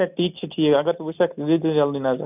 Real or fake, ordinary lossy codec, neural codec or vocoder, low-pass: real; none; none; 3.6 kHz